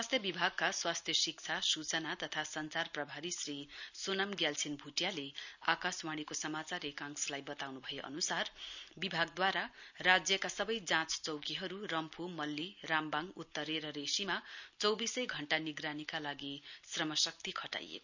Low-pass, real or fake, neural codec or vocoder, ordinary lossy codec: 7.2 kHz; real; none; none